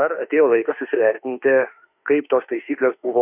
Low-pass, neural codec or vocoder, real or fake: 3.6 kHz; autoencoder, 48 kHz, 32 numbers a frame, DAC-VAE, trained on Japanese speech; fake